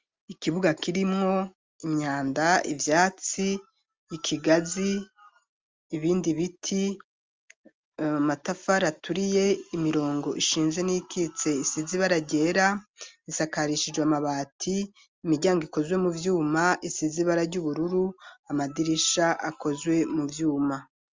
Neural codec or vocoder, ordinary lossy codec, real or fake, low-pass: none; Opus, 32 kbps; real; 7.2 kHz